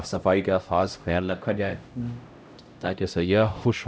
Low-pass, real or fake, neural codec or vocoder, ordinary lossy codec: none; fake; codec, 16 kHz, 0.5 kbps, X-Codec, HuBERT features, trained on LibriSpeech; none